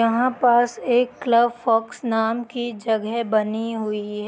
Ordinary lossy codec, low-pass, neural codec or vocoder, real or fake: none; none; none; real